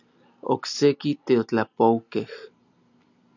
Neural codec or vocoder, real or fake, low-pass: none; real; 7.2 kHz